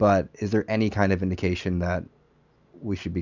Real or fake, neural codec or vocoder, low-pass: real; none; 7.2 kHz